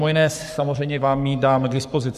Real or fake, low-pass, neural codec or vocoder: fake; 14.4 kHz; codec, 44.1 kHz, 7.8 kbps, Pupu-Codec